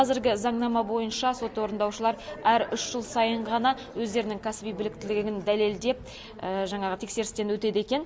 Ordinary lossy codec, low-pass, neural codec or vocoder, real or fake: none; none; none; real